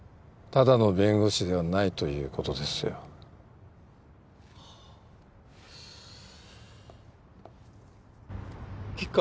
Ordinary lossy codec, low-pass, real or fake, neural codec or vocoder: none; none; real; none